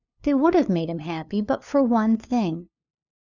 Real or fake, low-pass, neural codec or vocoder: fake; 7.2 kHz; codec, 16 kHz, 2 kbps, FunCodec, trained on LibriTTS, 25 frames a second